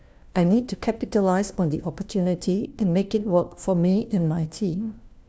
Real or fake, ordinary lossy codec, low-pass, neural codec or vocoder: fake; none; none; codec, 16 kHz, 1 kbps, FunCodec, trained on LibriTTS, 50 frames a second